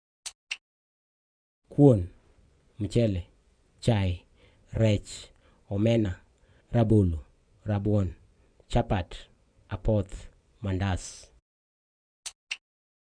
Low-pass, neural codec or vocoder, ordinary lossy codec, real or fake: 9.9 kHz; vocoder, 24 kHz, 100 mel bands, Vocos; none; fake